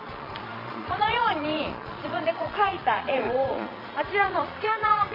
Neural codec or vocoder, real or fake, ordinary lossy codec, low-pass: vocoder, 22.05 kHz, 80 mel bands, Vocos; fake; MP3, 24 kbps; 5.4 kHz